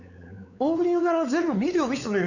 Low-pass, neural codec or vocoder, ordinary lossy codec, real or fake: 7.2 kHz; codec, 16 kHz, 4 kbps, X-Codec, WavLM features, trained on Multilingual LibriSpeech; none; fake